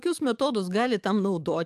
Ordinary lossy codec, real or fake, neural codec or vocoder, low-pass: AAC, 96 kbps; fake; codec, 44.1 kHz, 7.8 kbps, DAC; 14.4 kHz